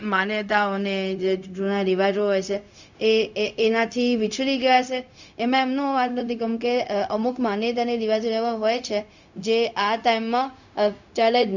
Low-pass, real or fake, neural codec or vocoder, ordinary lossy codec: 7.2 kHz; fake; codec, 16 kHz, 0.4 kbps, LongCat-Audio-Codec; none